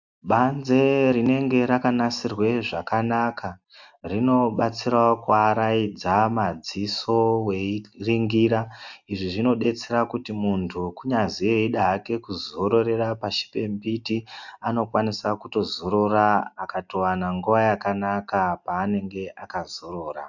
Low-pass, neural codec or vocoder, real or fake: 7.2 kHz; none; real